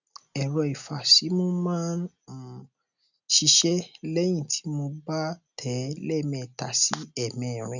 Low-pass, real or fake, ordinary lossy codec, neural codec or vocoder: 7.2 kHz; real; none; none